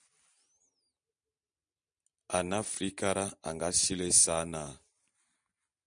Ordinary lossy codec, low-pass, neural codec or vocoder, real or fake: MP3, 96 kbps; 9.9 kHz; none; real